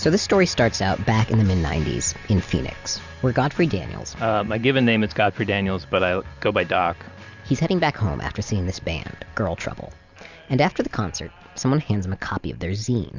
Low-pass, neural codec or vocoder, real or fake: 7.2 kHz; none; real